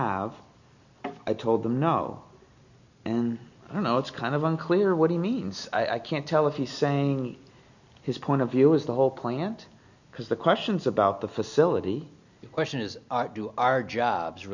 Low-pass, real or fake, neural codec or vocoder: 7.2 kHz; real; none